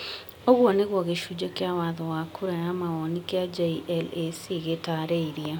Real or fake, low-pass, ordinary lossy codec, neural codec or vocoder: real; 19.8 kHz; none; none